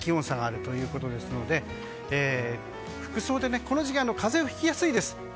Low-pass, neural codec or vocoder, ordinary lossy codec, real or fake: none; none; none; real